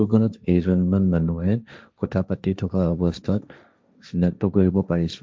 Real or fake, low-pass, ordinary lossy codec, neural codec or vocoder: fake; none; none; codec, 16 kHz, 1.1 kbps, Voila-Tokenizer